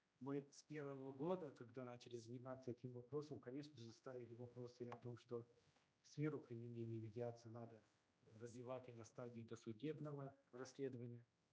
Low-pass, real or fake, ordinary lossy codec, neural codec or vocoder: none; fake; none; codec, 16 kHz, 1 kbps, X-Codec, HuBERT features, trained on general audio